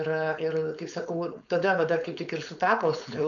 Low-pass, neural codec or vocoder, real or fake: 7.2 kHz; codec, 16 kHz, 4.8 kbps, FACodec; fake